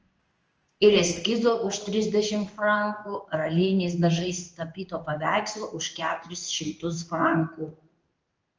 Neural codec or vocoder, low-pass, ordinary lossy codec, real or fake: codec, 16 kHz in and 24 kHz out, 1 kbps, XY-Tokenizer; 7.2 kHz; Opus, 24 kbps; fake